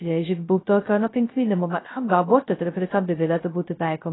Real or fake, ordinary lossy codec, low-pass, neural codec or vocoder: fake; AAC, 16 kbps; 7.2 kHz; codec, 16 kHz, 0.2 kbps, FocalCodec